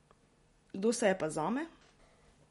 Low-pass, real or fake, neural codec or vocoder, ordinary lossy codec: 19.8 kHz; real; none; MP3, 48 kbps